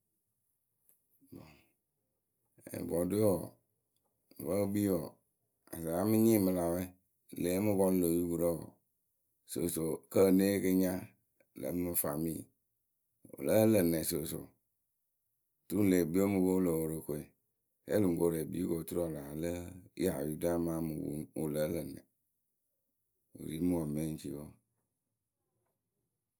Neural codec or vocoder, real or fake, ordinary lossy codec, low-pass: none; real; none; none